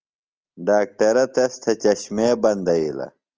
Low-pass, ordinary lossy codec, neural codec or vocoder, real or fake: 7.2 kHz; Opus, 24 kbps; none; real